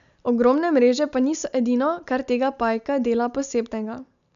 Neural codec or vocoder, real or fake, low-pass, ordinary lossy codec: none; real; 7.2 kHz; none